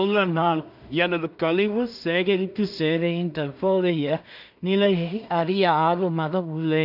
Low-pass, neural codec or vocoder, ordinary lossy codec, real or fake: 5.4 kHz; codec, 16 kHz in and 24 kHz out, 0.4 kbps, LongCat-Audio-Codec, two codebook decoder; none; fake